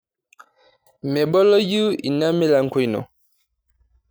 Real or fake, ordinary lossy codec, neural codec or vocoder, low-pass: real; none; none; none